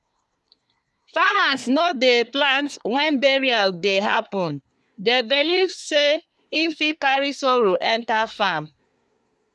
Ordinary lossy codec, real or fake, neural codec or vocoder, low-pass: none; fake; codec, 24 kHz, 1 kbps, SNAC; none